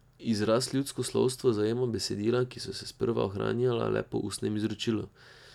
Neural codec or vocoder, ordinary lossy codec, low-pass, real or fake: none; none; 19.8 kHz; real